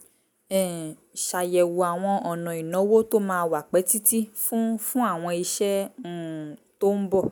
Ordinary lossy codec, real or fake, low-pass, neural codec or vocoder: none; real; none; none